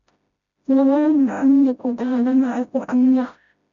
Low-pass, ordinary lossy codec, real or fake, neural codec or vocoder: 7.2 kHz; MP3, 64 kbps; fake; codec, 16 kHz, 0.5 kbps, FreqCodec, smaller model